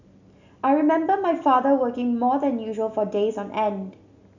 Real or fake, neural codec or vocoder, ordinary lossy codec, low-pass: real; none; none; 7.2 kHz